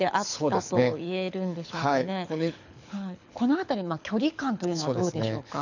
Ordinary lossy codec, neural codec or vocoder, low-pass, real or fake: none; codec, 24 kHz, 6 kbps, HILCodec; 7.2 kHz; fake